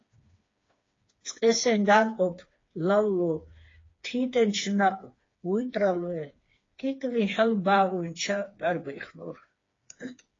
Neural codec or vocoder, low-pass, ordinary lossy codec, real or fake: codec, 16 kHz, 4 kbps, FreqCodec, smaller model; 7.2 kHz; AAC, 32 kbps; fake